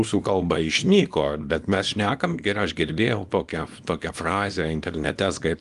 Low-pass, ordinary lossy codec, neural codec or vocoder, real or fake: 10.8 kHz; Opus, 32 kbps; codec, 24 kHz, 0.9 kbps, WavTokenizer, small release; fake